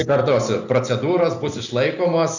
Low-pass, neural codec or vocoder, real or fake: 7.2 kHz; none; real